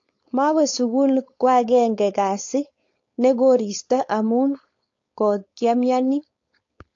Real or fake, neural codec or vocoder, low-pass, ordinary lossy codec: fake; codec, 16 kHz, 4.8 kbps, FACodec; 7.2 kHz; AAC, 48 kbps